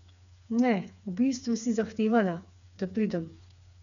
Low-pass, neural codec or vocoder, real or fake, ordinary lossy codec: 7.2 kHz; codec, 16 kHz, 4 kbps, FreqCodec, smaller model; fake; none